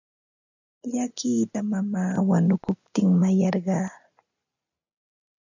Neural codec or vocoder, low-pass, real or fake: none; 7.2 kHz; real